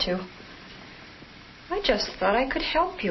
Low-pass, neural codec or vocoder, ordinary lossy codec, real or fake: 7.2 kHz; none; MP3, 24 kbps; real